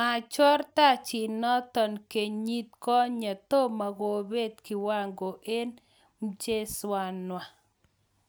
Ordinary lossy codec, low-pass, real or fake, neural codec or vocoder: none; none; real; none